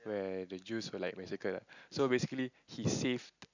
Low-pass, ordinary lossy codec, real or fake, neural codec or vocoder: 7.2 kHz; none; real; none